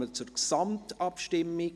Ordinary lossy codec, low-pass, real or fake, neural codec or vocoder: none; none; real; none